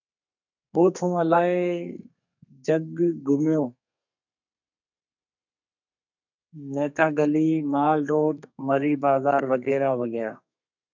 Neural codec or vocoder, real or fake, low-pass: codec, 32 kHz, 1.9 kbps, SNAC; fake; 7.2 kHz